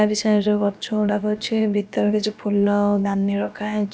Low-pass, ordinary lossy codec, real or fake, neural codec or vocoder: none; none; fake; codec, 16 kHz, about 1 kbps, DyCAST, with the encoder's durations